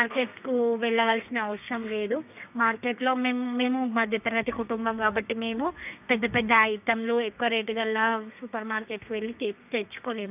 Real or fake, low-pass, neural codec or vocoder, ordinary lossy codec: fake; 3.6 kHz; codec, 32 kHz, 1.9 kbps, SNAC; none